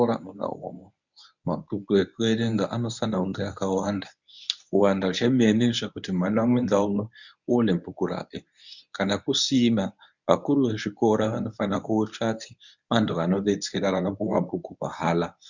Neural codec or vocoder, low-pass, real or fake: codec, 24 kHz, 0.9 kbps, WavTokenizer, medium speech release version 1; 7.2 kHz; fake